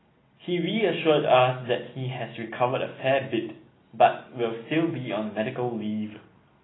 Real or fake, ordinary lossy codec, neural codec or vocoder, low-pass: real; AAC, 16 kbps; none; 7.2 kHz